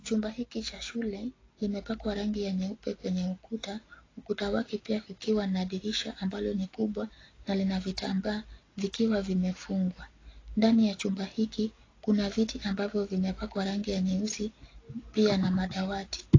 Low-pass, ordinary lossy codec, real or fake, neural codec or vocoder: 7.2 kHz; AAC, 32 kbps; real; none